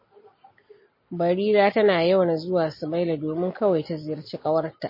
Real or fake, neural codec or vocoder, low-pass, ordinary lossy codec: real; none; 5.4 kHz; MP3, 24 kbps